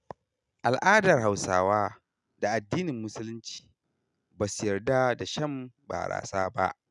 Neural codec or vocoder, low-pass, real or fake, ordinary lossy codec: none; 10.8 kHz; real; none